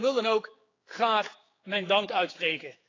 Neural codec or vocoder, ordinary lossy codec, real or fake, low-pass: codec, 16 kHz, 4 kbps, X-Codec, HuBERT features, trained on general audio; AAC, 32 kbps; fake; 7.2 kHz